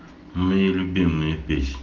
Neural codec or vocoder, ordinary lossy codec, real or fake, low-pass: none; Opus, 16 kbps; real; 7.2 kHz